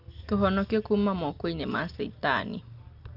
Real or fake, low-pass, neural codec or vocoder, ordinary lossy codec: real; 5.4 kHz; none; none